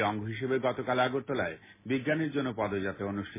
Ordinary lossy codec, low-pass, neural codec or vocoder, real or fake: MP3, 16 kbps; 3.6 kHz; none; real